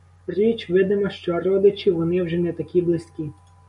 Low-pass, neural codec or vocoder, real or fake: 10.8 kHz; none; real